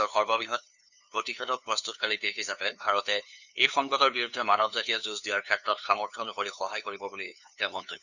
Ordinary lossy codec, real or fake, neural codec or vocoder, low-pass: none; fake; codec, 16 kHz, 2 kbps, FunCodec, trained on LibriTTS, 25 frames a second; 7.2 kHz